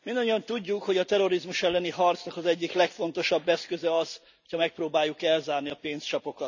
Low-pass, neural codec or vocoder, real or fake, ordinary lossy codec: 7.2 kHz; none; real; none